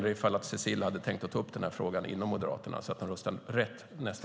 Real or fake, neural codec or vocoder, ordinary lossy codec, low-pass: real; none; none; none